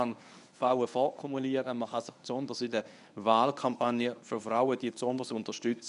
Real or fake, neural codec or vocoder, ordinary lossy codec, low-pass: fake; codec, 24 kHz, 0.9 kbps, WavTokenizer, medium speech release version 1; none; 10.8 kHz